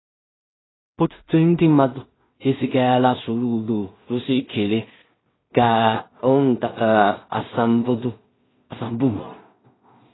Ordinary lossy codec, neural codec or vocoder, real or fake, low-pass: AAC, 16 kbps; codec, 16 kHz in and 24 kHz out, 0.4 kbps, LongCat-Audio-Codec, two codebook decoder; fake; 7.2 kHz